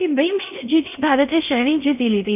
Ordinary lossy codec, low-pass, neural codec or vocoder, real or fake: none; 3.6 kHz; codec, 16 kHz in and 24 kHz out, 0.6 kbps, FocalCodec, streaming, 4096 codes; fake